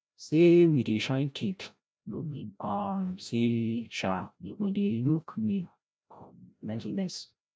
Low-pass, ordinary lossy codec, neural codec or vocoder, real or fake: none; none; codec, 16 kHz, 0.5 kbps, FreqCodec, larger model; fake